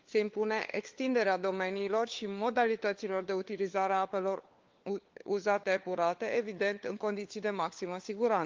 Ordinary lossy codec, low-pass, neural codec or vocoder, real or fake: Opus, 32 kbps; 7.2 kHz; codec, 16 kHz, 4 kbps, FunCodec, trained on LibriTTS, 50 frames a second; fake